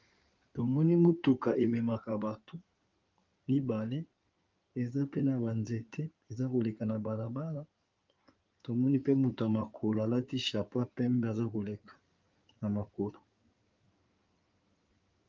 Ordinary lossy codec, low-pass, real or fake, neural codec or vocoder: Opus, 24 kbps; 7.2 kHz; fake; codec, 16 kHz in and 24 kHz out, 2.2 kbps, FireRedTTS-2 codec